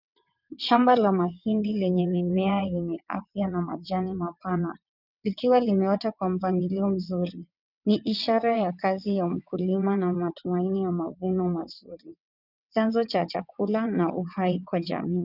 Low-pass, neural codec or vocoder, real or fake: 5.4 kHz; vocoder, 22.05 kHz, 80 mel bands, WaveNeXt; fake